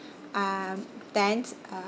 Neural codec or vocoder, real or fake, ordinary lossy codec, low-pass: none; real; none; none